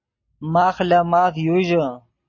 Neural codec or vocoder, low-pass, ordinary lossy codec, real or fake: none; 7.2 kHz; MP3, 32 kbps; real